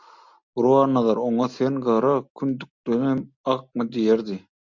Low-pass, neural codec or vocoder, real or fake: 7.2 kHz; none; real